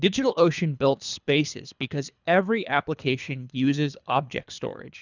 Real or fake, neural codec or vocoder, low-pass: fake; codec, 24 kHz, 3 kbps, HILCodec; 7.2 kHz